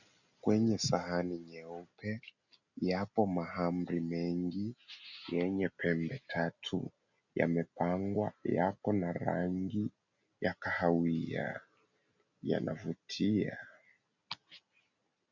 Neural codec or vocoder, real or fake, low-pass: none; real; 7.2 kHz